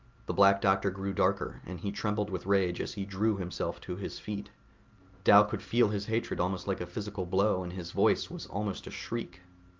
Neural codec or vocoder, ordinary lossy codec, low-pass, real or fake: none; Opus, 24 kbps; 7.2 kHz; real